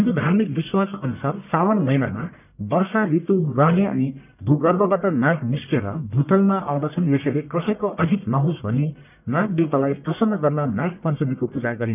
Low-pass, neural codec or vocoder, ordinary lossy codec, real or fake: 3.6 kHz; codec, 44.1 kHz, 1.7 kbps, Pupu-Codec; none; fake